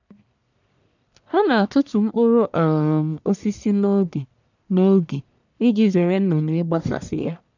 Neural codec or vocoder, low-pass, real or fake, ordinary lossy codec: codec, 44.1 kHz, 1.7 kbps, Pupu-Codec; 7.2 kHz; fake; none